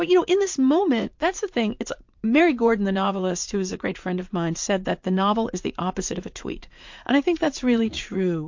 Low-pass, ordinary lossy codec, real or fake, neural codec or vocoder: 7.2 kHz; MP3, 48 kbps; fake; vocoder, 44.1 kHz, 80 mel bands, Vocos